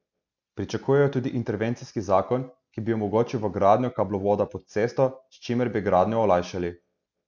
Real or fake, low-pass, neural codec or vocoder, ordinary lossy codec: real; 7.2 kHz; none; MP3, 64 kbps